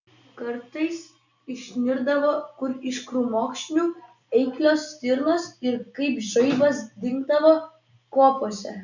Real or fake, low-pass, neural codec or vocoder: real; 7.2 kHz; none